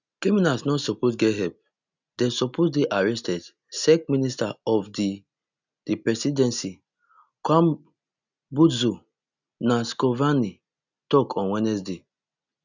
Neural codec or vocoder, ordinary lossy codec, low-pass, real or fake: none; none; 7.2 kHz; real